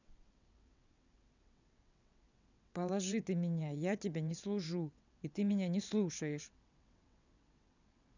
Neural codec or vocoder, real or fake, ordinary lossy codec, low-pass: vocoder, 22.05 kHz, 80 mel bands, WaveNeXt; fake; none; 7.2 kHz